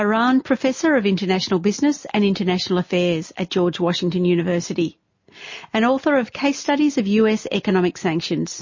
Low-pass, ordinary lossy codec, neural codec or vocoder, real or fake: 7.2 kHz; MP3, 32 kbps; none; real